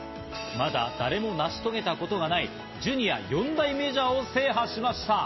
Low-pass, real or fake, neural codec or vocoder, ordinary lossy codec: 7.2 kHz; real; none; MP3, 24 kbps